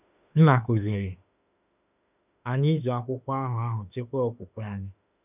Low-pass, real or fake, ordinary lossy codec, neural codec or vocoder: 3.6 kHz; fake; none; autoencoder, 48 kHz, 32 numbers a frame, DAC-VAE, trained on Japanese speech